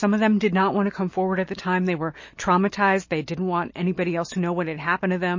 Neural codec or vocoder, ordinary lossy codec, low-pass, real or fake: none; MP3, 32 kbps; 7.2 kHz; real